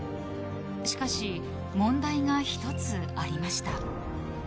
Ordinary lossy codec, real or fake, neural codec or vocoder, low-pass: none; real; none; none